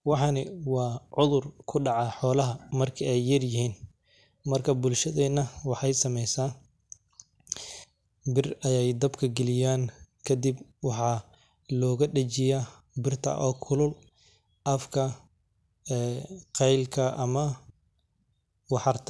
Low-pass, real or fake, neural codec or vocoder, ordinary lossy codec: none; real; none; none